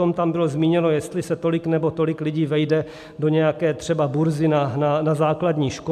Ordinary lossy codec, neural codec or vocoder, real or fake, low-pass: AAC, 96 kbps; none; real; 14.4 kHz